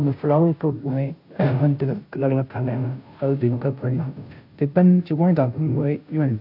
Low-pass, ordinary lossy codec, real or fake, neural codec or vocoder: 5.4 kHz; AAC, 48 kbps; fake; codec, 16 kHz, 0.5 kbps, FunCodec, trained on Chinese and English, 25 frames a second